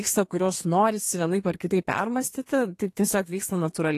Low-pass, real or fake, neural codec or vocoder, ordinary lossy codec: 14.4 kHz; fake; codec, 32 kHz, 1.9 kbps, SNAC; AAC, 48 kbps